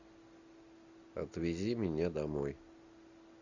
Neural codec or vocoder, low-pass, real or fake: none; 7.2 kHz; real